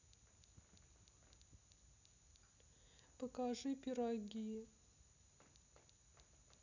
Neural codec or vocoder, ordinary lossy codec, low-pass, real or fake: none; none; 7.2 kHz; real